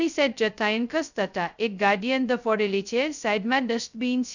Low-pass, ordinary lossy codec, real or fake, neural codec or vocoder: 7.2 kHz; none; fake; codec, 16 kHz, 0.2 kbps, FocalCodec